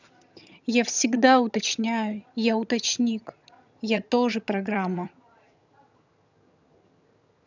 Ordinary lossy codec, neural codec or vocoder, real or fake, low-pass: none; vocoder, 22.05 kHz, 80 mel bands, HiFi-GAN; fake; 7.2 kHz